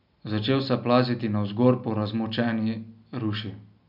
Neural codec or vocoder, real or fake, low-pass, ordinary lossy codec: none; real; 5.4 kHz; none